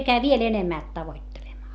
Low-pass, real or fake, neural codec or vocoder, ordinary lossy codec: none; real; none; none